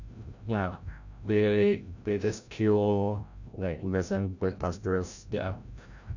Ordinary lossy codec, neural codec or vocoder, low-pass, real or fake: none; codec, 16 kHz, 0.5 kbps, FreqCodec, larger model; 7.2 kHz; fake